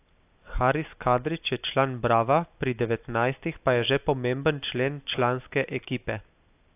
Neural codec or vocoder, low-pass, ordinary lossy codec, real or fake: none; 3.6 kHz; AAC, 32 kbps; real